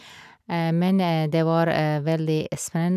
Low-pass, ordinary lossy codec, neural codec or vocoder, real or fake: 14.4 kHz; none; none; real